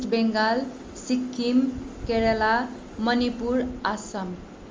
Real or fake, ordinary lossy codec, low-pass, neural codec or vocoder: real; Opus, 32 kbps; 7.2 kHz; none